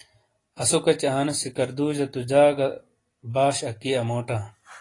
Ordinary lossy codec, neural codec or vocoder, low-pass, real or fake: AAC, 32 kbps; none; 10.8 kHz; real